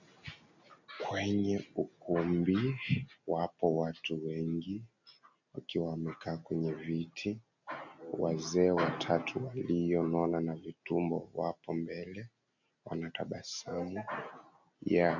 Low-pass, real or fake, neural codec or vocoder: 7.2 kHz; real; none